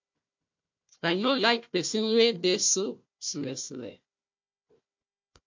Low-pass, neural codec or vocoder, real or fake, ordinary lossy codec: 7.2 kHz; codec, 16 kHz, 1 kbps, FunCodec, trained on Chinese and English, 50 frames a second; fake; MP3, 48 kbps